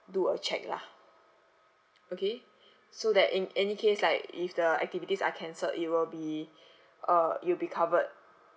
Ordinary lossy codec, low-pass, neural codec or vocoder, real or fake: none; none; none; real